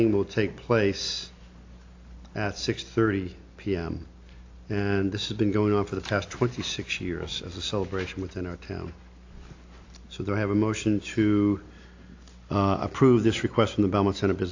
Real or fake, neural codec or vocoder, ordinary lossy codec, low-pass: real; none; AAC, 48 kbps; 7.2 kHz